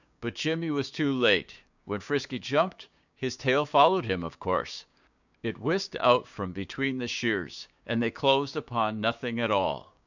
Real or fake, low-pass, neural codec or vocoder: fake; 7.2 kHz; codec, 16 kHz, 6 kbps, DAC